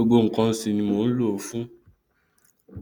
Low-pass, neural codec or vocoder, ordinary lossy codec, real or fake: none; none; none; real